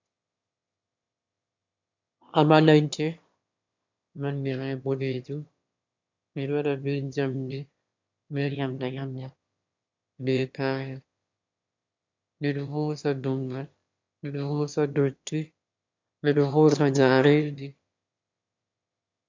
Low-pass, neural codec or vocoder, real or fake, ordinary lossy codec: 7.2 kHz; autoencoder, 22.05 kHz, a latent of 192 numbers a frame, VITS, trained on one speaker; fake; MP3, 64 kbps